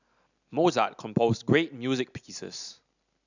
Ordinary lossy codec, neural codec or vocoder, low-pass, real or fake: none; none; 7.2 kHz; real